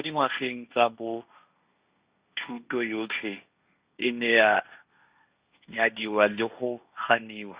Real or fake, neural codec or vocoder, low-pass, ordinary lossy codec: fake; codec, 16 kHz, 1.1 kbps, Voila-Tokenizer; 3.6 kHz; Opus, 64 kbps